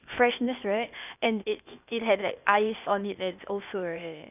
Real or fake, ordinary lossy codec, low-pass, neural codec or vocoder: fake; none; 3.6 kHz; codec, 16 kHz, 0.8 kbps, ZipCodec